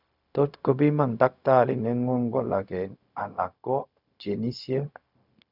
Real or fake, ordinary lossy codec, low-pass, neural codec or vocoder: fake; none; 5.4 kHz; codec, 16 kHz, 0.4 kbps, LongCat-Audio-Codec